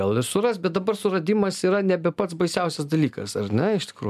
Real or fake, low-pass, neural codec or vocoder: real; 14.4 kHz; none